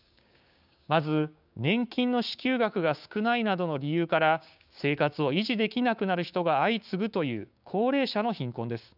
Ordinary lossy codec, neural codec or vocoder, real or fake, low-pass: none; codec, 16 kHz, 6 kbps, DAC; fake; 5.4 kHz